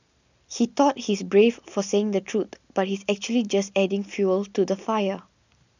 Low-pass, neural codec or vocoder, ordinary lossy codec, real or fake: 7.2 kHz; none; none; real